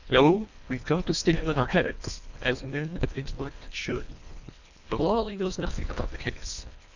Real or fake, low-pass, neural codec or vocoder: fake; 7.2 kHz; codec, 24 kHz, 1.5 kbps, HILCodec